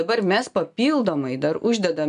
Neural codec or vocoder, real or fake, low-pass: none; real; 10.8 kHz